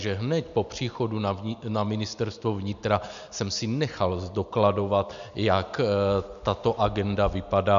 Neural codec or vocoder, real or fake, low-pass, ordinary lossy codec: none; real; 7.2 kHz; AAC, 96 kbps